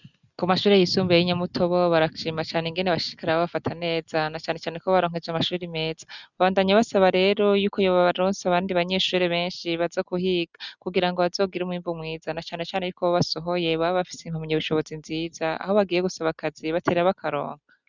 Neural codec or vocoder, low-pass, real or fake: none; 7.2 kHz; real